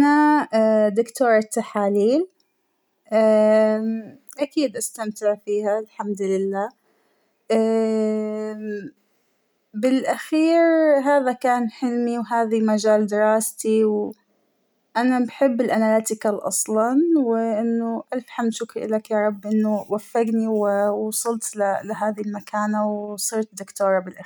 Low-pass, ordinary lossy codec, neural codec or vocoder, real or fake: none; none; none; real